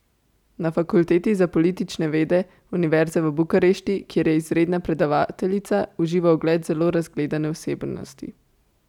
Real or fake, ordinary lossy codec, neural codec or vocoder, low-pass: real; none; none; 19.8 kHz